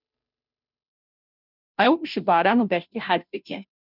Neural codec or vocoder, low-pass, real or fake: codec, 16 kHz, 0.5 kbps, FunCodec, trained on Chinese and English, 25 frames a second; 5.4 kHz; fake